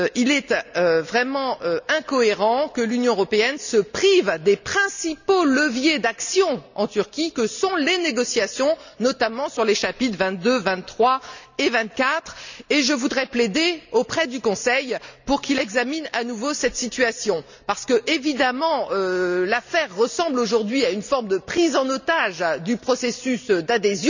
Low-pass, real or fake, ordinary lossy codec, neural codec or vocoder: 7.2 kHz; real; none; none